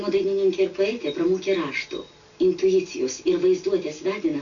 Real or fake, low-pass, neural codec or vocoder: real; 7.2 kHz; none